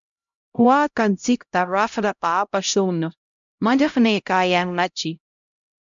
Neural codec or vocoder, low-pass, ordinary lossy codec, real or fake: codec, 16 kHz, 0.5 kbps, X-Codec, HuBERT features, trained on LibriSpeech; 7.2 kHz; MP3, 64 kbps; fake